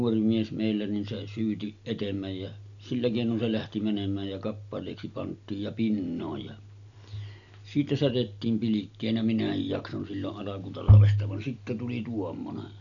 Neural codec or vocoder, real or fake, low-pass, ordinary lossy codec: none; real; 7.2 kHz; none